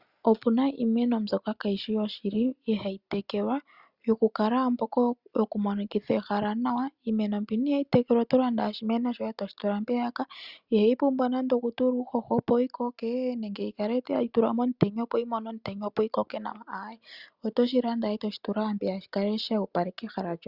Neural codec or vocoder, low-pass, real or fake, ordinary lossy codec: none; 5.4 kHz; real; Opus, 64 kbps